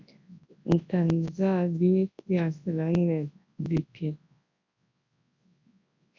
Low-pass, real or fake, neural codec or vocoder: 7.2 kHz; fake; codec, 24 kHz, 0.9 kbps, WavTokenizer, large speech release